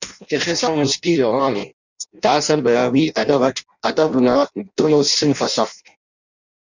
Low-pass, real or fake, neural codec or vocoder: 7.2 kHz; fake; codec, 16 kHz in and 24 kHz out, 0.6 kbps, FireRedTTS-2 codec